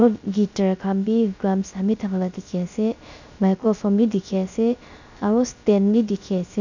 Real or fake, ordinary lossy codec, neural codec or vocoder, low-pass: fake; none; codec, 16 kHz, 0.3 kbps, FocalCodec; 7.2 kHz